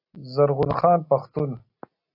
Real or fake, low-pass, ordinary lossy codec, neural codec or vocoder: real; 5.4 kHz; AAC, 32 kbps; none